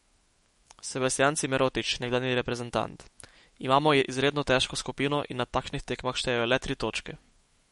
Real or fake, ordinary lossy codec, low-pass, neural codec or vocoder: fake; MP3, 48 kbps; 19.8 kHz; autoencoder, 48 kHz, 128 numbers a frame, DAC-VAE, trained on Japanese speech